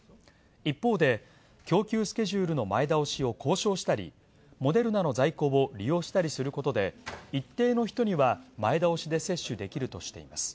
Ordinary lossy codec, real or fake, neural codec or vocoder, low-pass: none; real; none; none